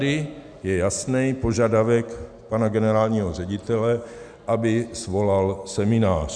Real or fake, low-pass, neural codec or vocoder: real; 9.9 kHz; none